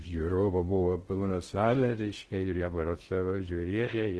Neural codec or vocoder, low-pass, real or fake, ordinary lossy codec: codec, 16 kHz in and 24 kHz out, 0.6 kbps, FocalCodec, streaming, 2048 codes; 10.8 kHz; fake; Opus, 16 kbps